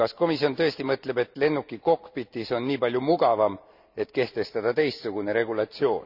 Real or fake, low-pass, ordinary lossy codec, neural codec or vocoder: real; 5.4 kHz; none; none